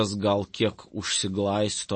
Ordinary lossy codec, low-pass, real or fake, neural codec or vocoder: MP3, 32 kbps; 9.9 kHz; real; none